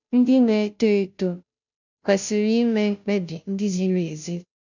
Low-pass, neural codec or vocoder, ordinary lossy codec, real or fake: 7.2 kHz; codec, 16 kHz, 0.5 kbps, FunCodec, trained on Chinese and English, 25 frames a second; none; fake